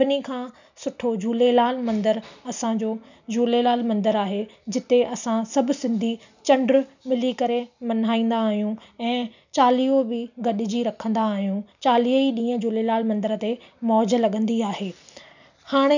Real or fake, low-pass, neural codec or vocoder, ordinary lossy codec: real; 7.2 kHz; none; none